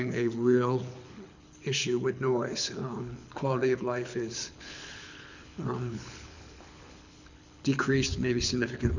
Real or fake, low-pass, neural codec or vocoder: fake; 7.2 kHz; codec, 24 kHz, 6 kbps, HILCodec